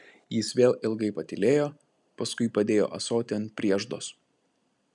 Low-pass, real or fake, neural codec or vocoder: 10.8 kHz; real; none